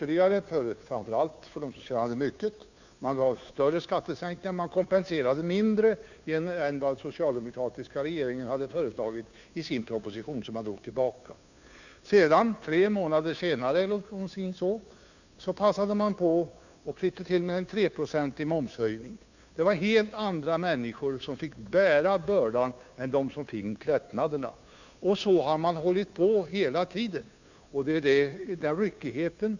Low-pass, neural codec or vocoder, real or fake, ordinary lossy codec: 7.2 kHz; codec, 16 kHz, 2 kbps, FunCodec, trained on Chinese and English, 25 frames a second; fake; none